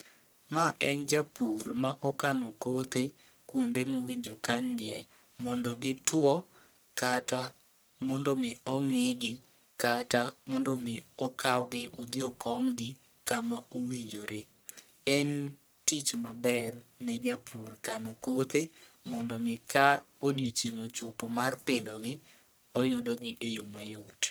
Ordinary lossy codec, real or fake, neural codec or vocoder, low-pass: none; fake; codec, 44.1 kHz, 1.7 kbps, Pupu-Codec; none